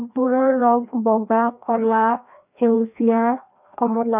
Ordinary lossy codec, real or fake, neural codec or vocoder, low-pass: none; fake; codec, 16 kHz, 1 kbps, FreqCodec, larger model; 3.6 kHz